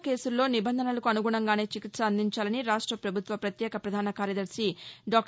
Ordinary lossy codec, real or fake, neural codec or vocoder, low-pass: none; real; none; none